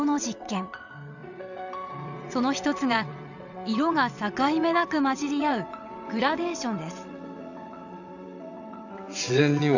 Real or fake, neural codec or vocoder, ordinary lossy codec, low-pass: fake; vocoder, 22.05 kHz, 80 mel bands, WaveNeXt; none; 7.2 kHz